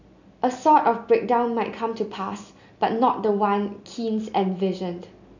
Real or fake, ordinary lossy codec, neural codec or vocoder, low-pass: real; none; none; 7.2 kHz